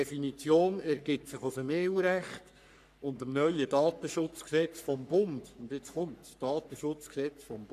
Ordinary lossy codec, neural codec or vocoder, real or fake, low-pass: none; codec, 44.1 kHz, 3.4 kbps, Pupu-Codec; fake; 14.4 kHz